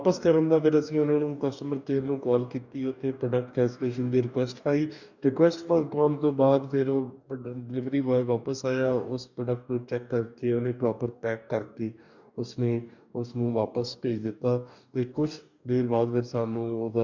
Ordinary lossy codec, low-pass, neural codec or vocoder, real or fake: none; 7.2 kHz; codec, 44.1 kHz, 2.6 kbps, DAC; fake